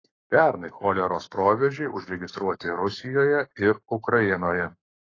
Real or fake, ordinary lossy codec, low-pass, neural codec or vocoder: fake; AAC, 32 kbps; 7.2 kHz; codec, 44.1 kHz, 7.8 kbps, Pupu-Codec